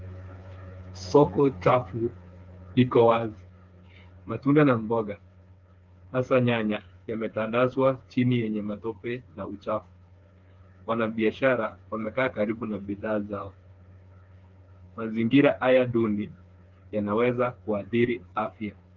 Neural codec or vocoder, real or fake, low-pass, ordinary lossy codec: codec, 16 kHz, 4 kbps, FreqCodec, smaller model; fake; 7.2 kHz; Opus, 24 kbps